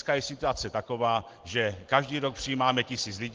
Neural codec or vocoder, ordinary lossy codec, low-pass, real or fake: none; Opus, 16 kbps; 7.2 kHz; real